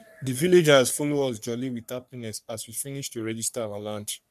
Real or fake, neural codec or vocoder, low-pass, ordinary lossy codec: fake; codec, 44.1 kHz, 3.4 kbps, Pupu-Codec; 14.4 kHz; none